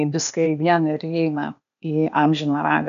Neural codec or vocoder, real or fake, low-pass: codec, 16 kHz, 0.8 kbps, ZipCodec; fake; 7.2 kHz